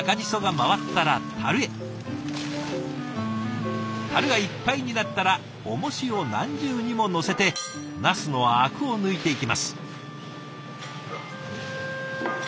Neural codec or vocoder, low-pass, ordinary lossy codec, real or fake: none; none; none; real